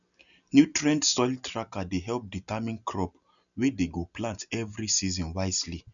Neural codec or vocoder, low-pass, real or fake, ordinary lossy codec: none; 7.2 kHz; real; none